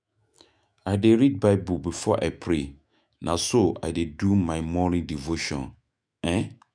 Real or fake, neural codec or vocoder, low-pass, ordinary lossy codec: fake; autoencoder, 48 kHz, 128 numbers a frame, DAC-VAE, trained on Japanese speech; 9.9 kHz; none